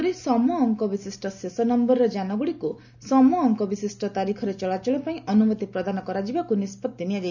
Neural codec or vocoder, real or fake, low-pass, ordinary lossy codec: none; real; 7.2 kHz; none